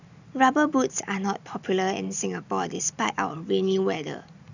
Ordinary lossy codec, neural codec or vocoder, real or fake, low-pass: none; none; real; 7.2 kHz